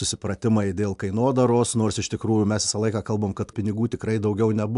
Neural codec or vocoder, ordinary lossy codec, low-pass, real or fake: none; AAC, 96 kbps; 10.8 kHz; real